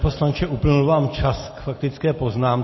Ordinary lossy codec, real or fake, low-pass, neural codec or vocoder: MP3, 24 kbps; real; 7.2 kHz; none